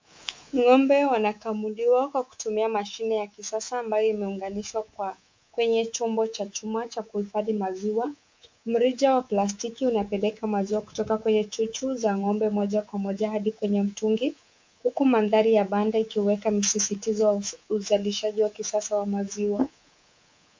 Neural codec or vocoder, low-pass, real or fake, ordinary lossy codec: codec, 24 kHz, 3.1 kbps, DualCodec; 7.2 kHz; fake; MP3, 64 kbps